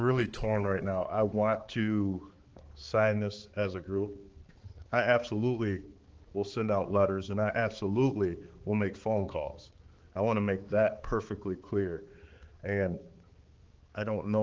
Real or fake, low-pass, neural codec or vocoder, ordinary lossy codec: fake; 7.2 kHz; codec, 16 kHz, 4 kbps, X-Codec, HuBERT features, trained on balanced general audio; Opus, 16 kbps